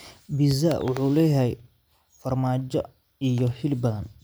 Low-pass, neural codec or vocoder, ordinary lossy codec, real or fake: none; none; none; real